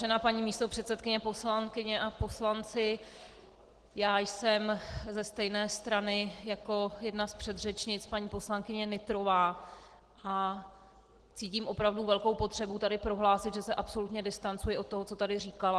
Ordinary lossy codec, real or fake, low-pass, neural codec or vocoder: Opus, 16 kbps; real; 10.8 kHz; none